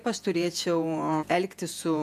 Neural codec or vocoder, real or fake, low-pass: vocoder, 48 kHz, 128 mel bands, Vocos; fake; 14.4 kHz